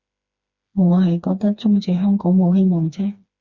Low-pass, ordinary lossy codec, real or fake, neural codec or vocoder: 7.2 kHz; Opus, 64 kbps; fake; codec, 16 kHz, 2 kbps, FreqCodec, smaller model